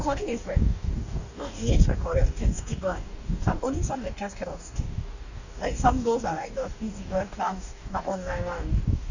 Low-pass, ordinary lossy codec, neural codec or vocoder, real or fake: 7.2 kHz; none; codec, 44.1 kHz, 2.6 kbps, DAC; fake